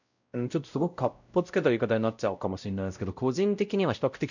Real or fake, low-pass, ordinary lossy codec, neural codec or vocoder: fake; 7.2 kHz; none; codec, 16 kHz, 0.5 kbps, X-Codec, WavLM features, trained on Multilingual LibriSpeech